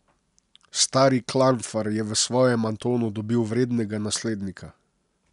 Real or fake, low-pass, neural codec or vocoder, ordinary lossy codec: real; 10.8 kHz; none; none